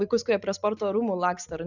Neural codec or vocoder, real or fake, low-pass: none; real; 7.2 kHz